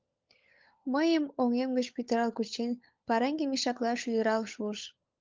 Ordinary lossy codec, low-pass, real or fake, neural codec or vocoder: Opus, 24 kbps; 7.2 kHz; fake; codec, 16 kHz, 16 kbps, FunCodec, trained on LibriTTS, 50 frames a second